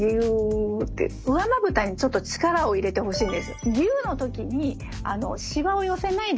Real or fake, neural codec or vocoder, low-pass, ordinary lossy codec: real; none; none; none